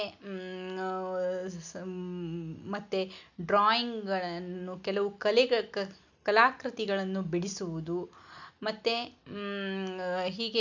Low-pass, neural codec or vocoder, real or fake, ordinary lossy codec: 7.2 kHz; none; real; none